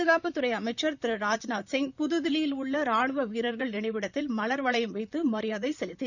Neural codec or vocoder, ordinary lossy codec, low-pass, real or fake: vocoder, 44.1 kHz, 128 mel bands, Pupu-Vocoder; none; 7.2 kHz; fake